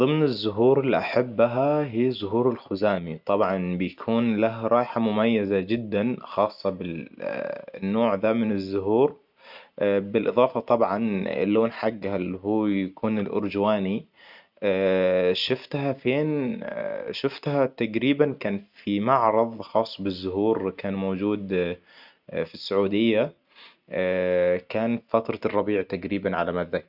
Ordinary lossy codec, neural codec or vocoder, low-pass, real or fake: none; none; 5.4 kHz; real